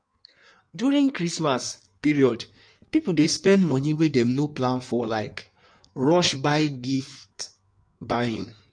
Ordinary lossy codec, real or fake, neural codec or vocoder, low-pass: none; fake; codec, 16 kHz in and 24 kHz out, 1.1 kbps, FireRedTTS-2 codec; 9.9 kHz